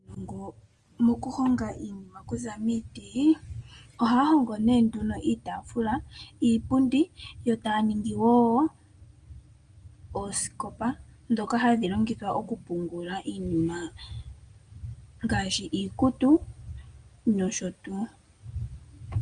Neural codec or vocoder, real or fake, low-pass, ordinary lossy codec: none; real; 9.9 kHz; Opus, 32 kbps